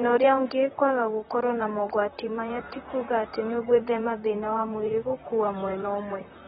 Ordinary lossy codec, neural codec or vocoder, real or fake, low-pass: AAC, 16 kbps; vocoder, 44.1 kHz, 128 mel bands, Pupu-Vocoder; fake; 19.8 kHz